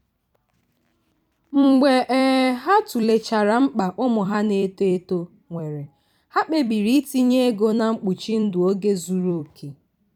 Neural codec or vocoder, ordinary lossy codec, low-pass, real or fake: vocoder, 44.1 kHz, 128 mel bands every 256 samples, BigVGAN v2; none; 19.8 kHz; fake